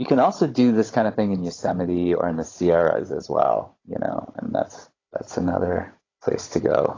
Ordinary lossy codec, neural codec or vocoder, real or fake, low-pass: AAC, 32 kbps; codec, 16 kHz, 16 kbps, FunCodec, trained on Chinese and English, 50 frames a second; fake; 7.2 kHz